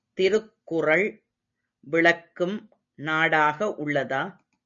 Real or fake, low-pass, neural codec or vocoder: real; 7.2 kHz; none